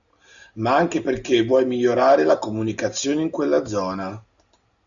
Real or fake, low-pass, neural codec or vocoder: real; 7.2 kHz; none